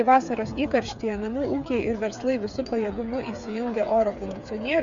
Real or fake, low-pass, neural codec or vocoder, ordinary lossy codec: fake; 7.2 kHz; codec, 16 kHz, 8 kbps, FreqCodec, smaller model; MP3, 64 kbps